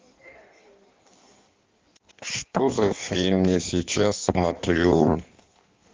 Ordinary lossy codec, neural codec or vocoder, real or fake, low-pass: Opus, 32 kbps; codec, 16 kHz in and 24 kHz out, 1.1 kbps, FireRedTTS-2 codec; fake; 7.2 kHz